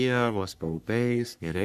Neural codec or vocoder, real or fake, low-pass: codec, 44.1 kHz, 3.4 kbps, Pupu-Codec; fake; 14.4 kHz